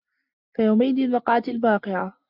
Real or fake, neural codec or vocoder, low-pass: real; none; 5.4 kHz